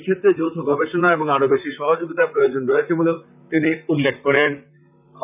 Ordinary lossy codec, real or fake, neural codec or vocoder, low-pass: none; fake; vocoder, 44.1 kHz, 128 mel bands, Pupu-Vocoder; 3.6 kHz